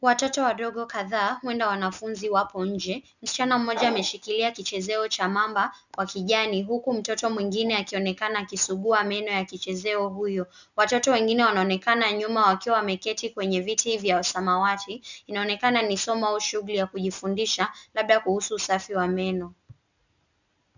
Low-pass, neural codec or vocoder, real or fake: 7.2 kHz; none; real